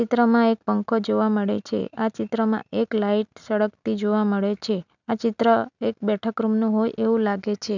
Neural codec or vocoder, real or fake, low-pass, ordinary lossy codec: none; real; 7.2 kHz; none